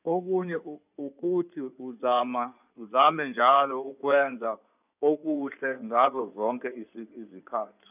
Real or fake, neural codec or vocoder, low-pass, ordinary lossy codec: fake; codec, 16 kHz in and 24 kHz out, 2.2 kbps, FireRedTTS-2 codec; 3.6 kHz; none